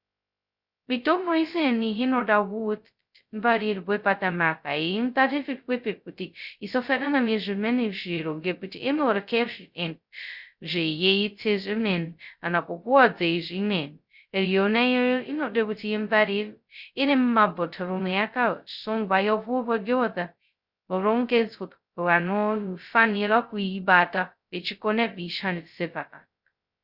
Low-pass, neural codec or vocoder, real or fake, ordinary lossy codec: 5.4 kHz; codec, 16 kHz, 0.2 kbps, FocalCodec; fake; Opus, 64 kbps